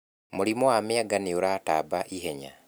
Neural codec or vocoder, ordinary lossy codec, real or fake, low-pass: none; none; real; none